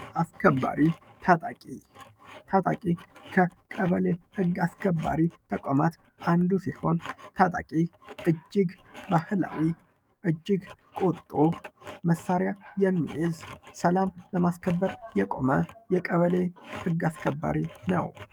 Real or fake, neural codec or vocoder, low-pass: fake; codec, 44.1 kHz, 7.8 kbps, DAC; 19.8 kHz